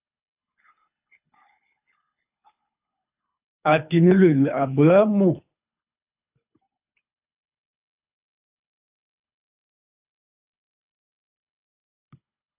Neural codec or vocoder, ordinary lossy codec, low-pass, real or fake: codec, 24 kHz, 3 kbps, HILCodec; AAC, 32 kbps; 3.6 kHz; fake